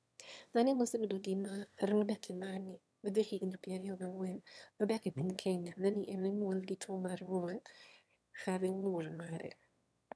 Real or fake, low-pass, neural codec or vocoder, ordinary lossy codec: fake; none; autoencoder, 22.05 kHz, a latent of 192 numbers a frame, VITS, trained on one speaker; none